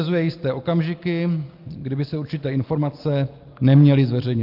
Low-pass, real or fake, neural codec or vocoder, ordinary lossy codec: 5.4 kHz; real; none; Opus, 32 kbps